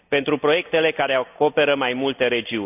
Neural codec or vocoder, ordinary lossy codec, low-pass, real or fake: none; none; 3.6 kHz; real